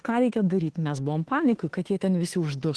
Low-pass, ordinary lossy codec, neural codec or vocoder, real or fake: 10.8 kHz; Opus, 16 kbps; autoencoder, 48 kHz, 32 numbers a frame, DAC-VAE, trained on Japanese speech; fake